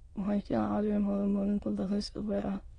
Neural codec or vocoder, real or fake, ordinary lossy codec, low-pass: autoencoder, 22.05 kHz, a latent of 192 numbers a frame, VITS, trained on many speakers; fake; AAC, 32 kbps; 9.9 kHz